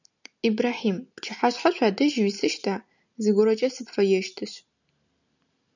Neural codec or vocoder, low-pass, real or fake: none; 7.2 kHz; real